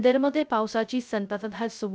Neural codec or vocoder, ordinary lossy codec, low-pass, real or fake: codec, 16 kHz, 0.2 kbps, FocalCodec; none; none; fake